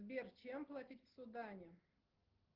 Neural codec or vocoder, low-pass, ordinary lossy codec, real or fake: none; 5.4 kHz; Opus, 16 kbps; real